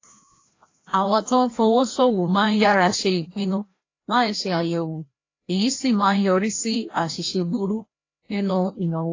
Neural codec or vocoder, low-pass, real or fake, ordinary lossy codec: codec, 16 kHz, 1 kbps, FreqCodec, larger model; 7.2 kHz; fake; AAC, 32 kbps